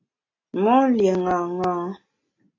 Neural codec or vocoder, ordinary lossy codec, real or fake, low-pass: none; AAC, 32 kbps; real; 7.2 kHz